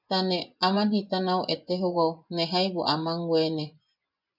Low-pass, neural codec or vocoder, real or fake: 5.4 kHz; vocoder, 24 kHz, 100 mel bands, Vocos; fake